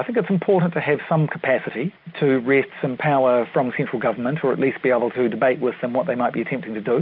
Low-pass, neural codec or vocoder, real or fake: 5.4 kHz; none; real